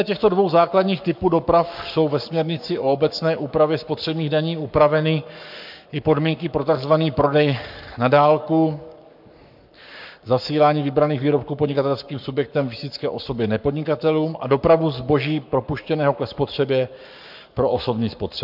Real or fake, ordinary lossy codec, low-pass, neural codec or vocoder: fake; MP3, 48 kbps; 5.4 kHz; codec, 44.1 kHz, 7.8 kbps, Pupu-Codec